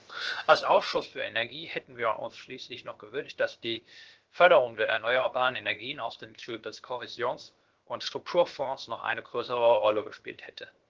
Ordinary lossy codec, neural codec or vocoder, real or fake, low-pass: Opus, 16 kbps; codec, 16 kHz, 0.7 kbps, FocalCodec; fake; 7.2 kHz